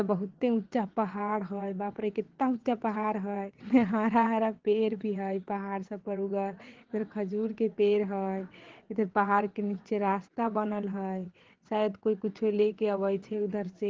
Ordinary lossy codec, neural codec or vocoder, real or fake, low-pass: Opus, 16 kbps; vocoder, 22.05 kHz, 80 mel bands, WaveNeXt; fake; 7.2 kHz